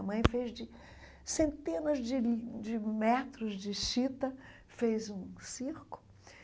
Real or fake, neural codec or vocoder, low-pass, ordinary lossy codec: real; none; none; none